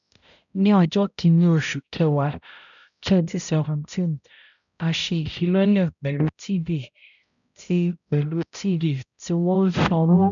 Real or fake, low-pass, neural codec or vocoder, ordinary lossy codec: fake; 7.2 kHz; codec, 16 kHz, 0.5 kbps, X-Codec, HuBERT features, trained on balanced general audio; none